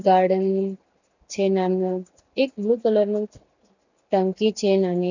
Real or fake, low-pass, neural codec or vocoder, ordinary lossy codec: real; 7.2 kHz; none; none